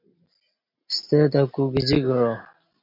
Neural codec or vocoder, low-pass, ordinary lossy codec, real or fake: none; 5.4 kHz; MP3, 48 kbps; real